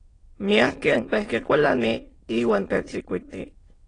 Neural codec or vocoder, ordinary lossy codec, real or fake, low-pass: autoencoder, 22.05 kHz, a latent of 192 numbers a frame, VITS, trained on many speakers; AAC, 32 kbps; fake; 9.9 kHz